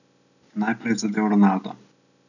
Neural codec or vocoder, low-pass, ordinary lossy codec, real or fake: none; 7.2 kHz; none; real